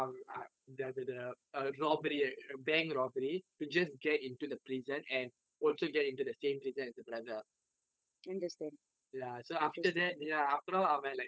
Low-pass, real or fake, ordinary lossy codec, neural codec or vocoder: none; fake; none; codec, 16 kHz, 8 kbps, FunCodec, trained on Chinese and English, 25 frames a second